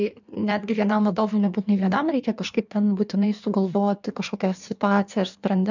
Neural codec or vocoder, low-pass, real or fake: codec, 16 kHz in and 24 kHz out, 1.1 kbps, FireRedTTS-2 codec; 7.2 kHz; fake